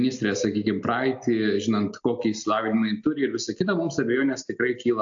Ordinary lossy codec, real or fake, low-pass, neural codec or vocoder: AAC, 64 kbps; real; 7.2 kHz; none